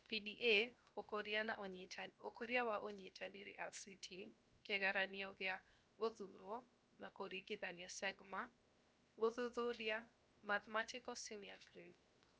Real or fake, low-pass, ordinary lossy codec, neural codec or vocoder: fake; none; none; codec, 16 kHz, 0.7 kbps, FocalCodec